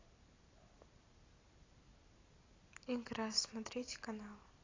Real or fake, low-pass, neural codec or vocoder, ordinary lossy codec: real; 7.2 kHz; none; AAC, 32 kbps